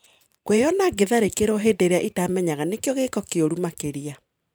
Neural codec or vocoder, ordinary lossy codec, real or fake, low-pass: vocoder, 44.1 kHz, 128 mel bands every 512 samples, BigVGAN v2; none; fake; none